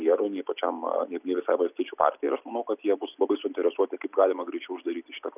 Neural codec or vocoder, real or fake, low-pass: none; real; 3.6 kHz